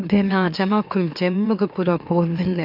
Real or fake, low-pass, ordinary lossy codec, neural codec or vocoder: fake; 5.4 kHz; none; autoencoder, 44.1 kHz, a latent of 192 numbers a frame, MeloTTS